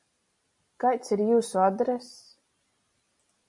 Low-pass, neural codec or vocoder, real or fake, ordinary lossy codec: 10.8 kHz; none; real; MP3, 48 kbps